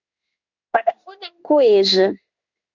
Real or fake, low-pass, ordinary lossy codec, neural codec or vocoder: fake; 7.2 kHz; Opus, 64 kbps; codec, 16 kHz in and 24 kHz out, 1 kbps, XY-Tokenizer